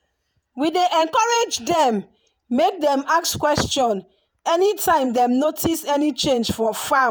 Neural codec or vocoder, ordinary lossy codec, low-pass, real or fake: vocoder, 48 kHz, 128 mel bands, Vocos; none; none; fake